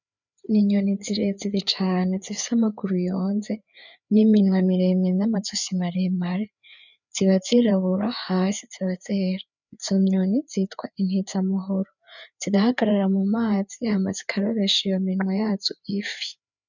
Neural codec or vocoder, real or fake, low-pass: codec, 16 kHz, 4 kbps, FreqCodec, larger model; fake; 7.2 kHz